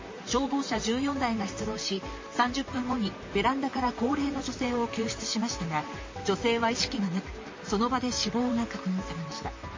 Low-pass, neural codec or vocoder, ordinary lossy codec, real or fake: 7.2 kHz; vocoder, 44.1 kHz, 128 mel bands, Pupu-Vocoder; MP3, 32 kbps; fake